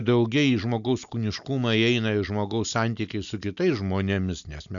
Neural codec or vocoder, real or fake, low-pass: none; real; 7.2 kHz